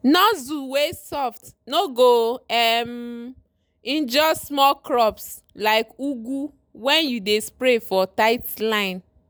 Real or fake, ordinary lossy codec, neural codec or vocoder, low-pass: real; none; none; none